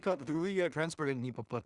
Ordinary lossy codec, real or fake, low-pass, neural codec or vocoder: Opus, 64 kbps; fake; 10.8 kHz; codec, 16 kHz in and 24 kHz out, 0.4 kbps, LongCat-Audio-Codec, two codebook decoder